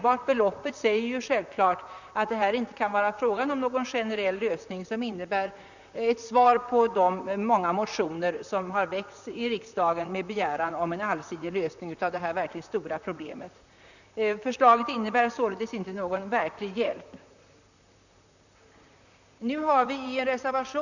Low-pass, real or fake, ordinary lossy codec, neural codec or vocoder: 7.2 kHz; fake; none; vocoder, 44.1 kHz, 128 mel bands, Pupu-Vocoder